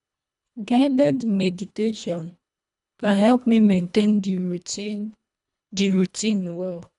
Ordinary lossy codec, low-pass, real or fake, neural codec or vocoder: none; 10.8 kHz; fake; codec, 24 kHz, 1.5 kbps, HILCodec